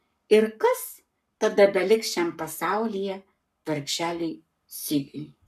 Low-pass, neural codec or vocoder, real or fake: 14.4 kHz; codec, 44.1 kHz, 7.8 kbps, Pupu-Codec; fake